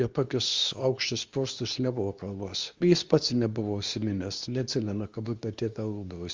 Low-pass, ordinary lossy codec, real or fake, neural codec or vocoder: 7.2 kHz; Opus, 32 kbps; fake; codec, 24 kHz, 0.9 kbps, WavTokenizer, medium speech release version 2